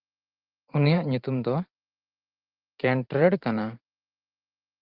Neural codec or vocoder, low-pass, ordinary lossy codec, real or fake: vocoder, 44.1 kHz, 128 mel bands every 512 samples, BigVGAN v2; 5.4 kHz; Opus, 24 kbps; fake